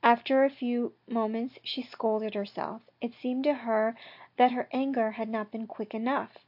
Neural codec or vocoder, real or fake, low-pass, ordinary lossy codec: none; real; 5.4 kHz; AAC, 48 kbps